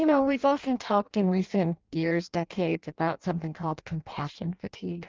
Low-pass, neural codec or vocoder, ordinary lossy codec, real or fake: 7.2 kHz; codec, 16 kHz in and 24 kHz out, 0.6 kbps, FireRedTTS-2 codec; Opus, 24 kbps; fake